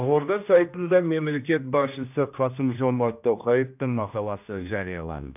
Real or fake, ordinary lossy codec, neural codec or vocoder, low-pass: fake; none; codec, 16 kHz, 1 kbps, X-Codec, HuBERT features, trained on general audio; 3.6 kHz